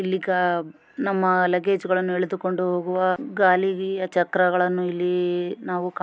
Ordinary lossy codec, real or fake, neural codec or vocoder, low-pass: none; real; none; none